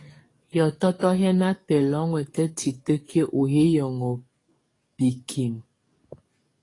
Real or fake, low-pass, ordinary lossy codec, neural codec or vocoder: fake; 10.8 kHz; AAC, 32 kbps; codec, 44.1 kHz, 7.8 kbps, DAC